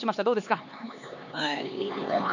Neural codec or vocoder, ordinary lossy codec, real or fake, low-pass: codec, 16 kHz, 4 kbps, X-Codec, HuBERT features, trained on LibriSpeech; none; fake; 7.2 kHz